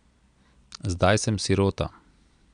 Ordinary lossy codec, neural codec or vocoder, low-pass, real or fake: none; none; 9.9 kHz; real